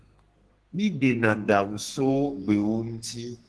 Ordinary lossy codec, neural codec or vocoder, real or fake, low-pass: Opus, 16 kbps; codec, 32 kHz, 1.9 kbps, SNAC; fake; 10.8 kHz